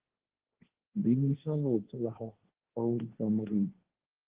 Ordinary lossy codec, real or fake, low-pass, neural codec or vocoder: Opus, 16 kbps; fake; 3.6 kHz; codec, 16 kHz, 1.1 kbps, Voila-Tokenizer